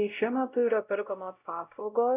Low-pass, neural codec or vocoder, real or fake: 3.6 kHz; codec, 16 kHz, 0.5 kbps, X-Codec, WavLM features, trained on Multilingual LibriSpeech; fake